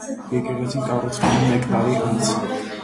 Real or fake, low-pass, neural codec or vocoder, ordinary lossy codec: real; 10.8 kHz; none; AAC, 32 kbps